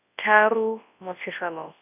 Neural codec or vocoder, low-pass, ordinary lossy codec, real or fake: codec, 24 kHz, 0.9 kbps, WavTokenizer, large speech release; 3.6 kHz; none; fake